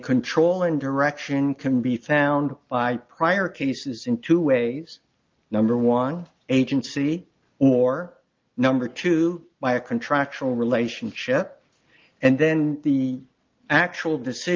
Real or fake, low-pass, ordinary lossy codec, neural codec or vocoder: real; 7.2 kHz; Opus, 24 kbps; none